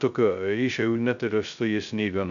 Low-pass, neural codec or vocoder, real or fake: 7.2 kHz; codec, 16 kHz, 0.2 kbps, FocalCodec; fake